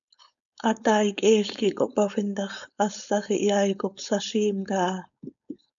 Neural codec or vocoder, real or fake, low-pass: codec, 16 kHz, 4.8 kbps, FACodec; fake; 7.2 kHz